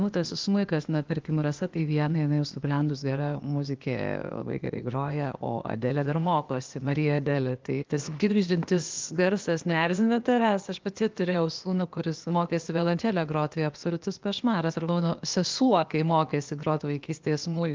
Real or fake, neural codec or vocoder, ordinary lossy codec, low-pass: fake; codec, 16 kHz, 0.8 kbps, ZipCodec; Opus, 32 kbps; 7.2 kHz